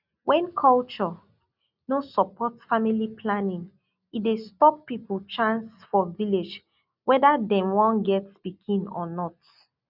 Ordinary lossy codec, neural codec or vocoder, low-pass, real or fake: none; none; 5.4 kHz; real